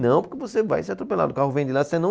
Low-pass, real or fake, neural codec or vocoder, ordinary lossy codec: none; real; none; none